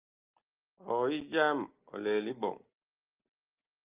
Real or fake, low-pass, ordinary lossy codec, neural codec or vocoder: real; 3.6 kHz; Opus, 24 kbps; none